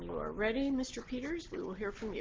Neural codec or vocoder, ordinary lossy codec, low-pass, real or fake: none; Opus, 16 kbps; 7.2 kHz; real